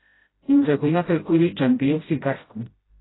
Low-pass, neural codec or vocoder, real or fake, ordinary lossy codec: 7.2 kHz; codec, 16 kHz, 0.5 kbps, FreqCodec, smaller model; fake; AAC, 16 kbps